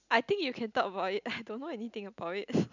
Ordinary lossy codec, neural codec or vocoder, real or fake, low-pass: none; none; real; 7.2 kHz